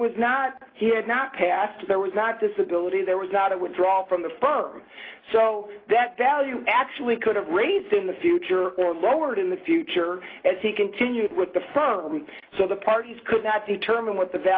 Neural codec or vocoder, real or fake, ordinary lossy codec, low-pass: none; real; AAC, 24 kbps; 5.4 kHz